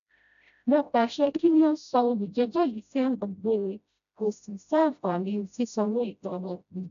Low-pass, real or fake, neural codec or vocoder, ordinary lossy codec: 7.2 kHz; fake; codec, 16 kHz, 0.5 kbps, FreqCodec, smaller model; none